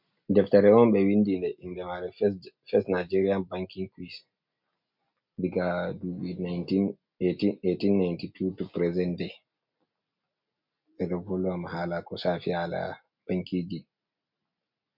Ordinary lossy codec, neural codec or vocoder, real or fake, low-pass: MP3, 48 kbps; none; real; 5.4 kHz